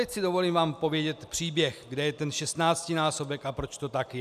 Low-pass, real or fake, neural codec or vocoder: 14.4 kHz; real; none